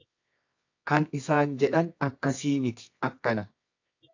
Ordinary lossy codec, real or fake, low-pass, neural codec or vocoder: AAC, 48 kbps; fake; 7.2 kHz; codec, 24 kHz, 0.9 kbps, WavTokenizer, medium music audio release